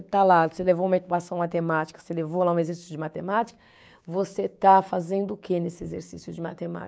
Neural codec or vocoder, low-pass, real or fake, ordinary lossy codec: codec, 16 kHz, 6 kbps, DAC; none; fake; none